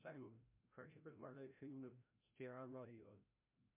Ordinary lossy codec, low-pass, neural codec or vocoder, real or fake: MP3, 32 kbps; 3.6 kHz; codec, 16 kHz, 0.5 kbps, FreqCodec, larger model; fake